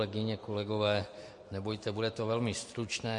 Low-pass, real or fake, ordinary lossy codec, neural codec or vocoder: 10.8 kHz; fake; MP3, 48 kbps; vocoder, 44.1 kHz, 128 mel bands every 256 samples, BigVGAN v2